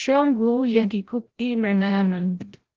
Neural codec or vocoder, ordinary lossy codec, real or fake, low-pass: codec, 16 kHz, 0.5 kbps, FreqCodec, larger model; Opus, 16 kbps; fake; 7.2 kHz